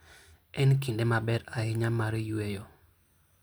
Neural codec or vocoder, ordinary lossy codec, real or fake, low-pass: none; none; real; none